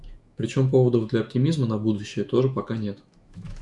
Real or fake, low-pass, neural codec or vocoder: fake; 10.8 kHz; autoencoder, 48 kHz, 128 numbers a frame, DAC-VAE, trained on Japanese speech